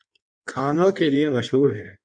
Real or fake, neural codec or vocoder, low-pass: fake; codec, 16 kHz in and 24 kHz out, 1.1 kbps, FireRedTTS-2 codec; 9.9 kHz